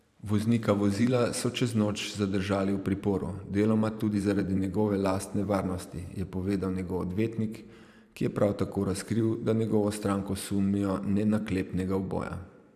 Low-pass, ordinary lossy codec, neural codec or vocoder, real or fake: 14.4 kHz; none; vocoder, 44.1 kHz, 128 mel bands every 512 samples, BigVGAN v2; fake